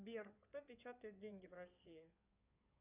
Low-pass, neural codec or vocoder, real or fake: 3.6 kHz; codec, 16 kHz in and 24 kHz out, 2.2 kbps, FireRedTTS-2 codec; fake